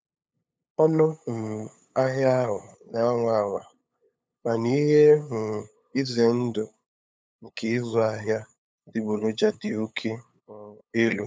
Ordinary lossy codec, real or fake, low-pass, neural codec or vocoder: none; fake; none; codec, 16 kHz, 8 kbps, FunCodec, trained on LibriTTS, 25 frames a second